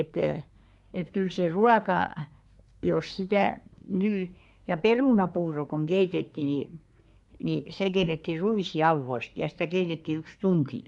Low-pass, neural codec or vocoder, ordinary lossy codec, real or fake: 10.8 kHz; codec, 24 kHz, 1 kbps, SNAC; none; fake